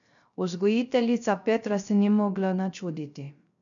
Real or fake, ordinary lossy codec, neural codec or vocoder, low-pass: fake; none; codec, 16 kHz, 0.3 kbps, FocalCodec; 7.2 kHz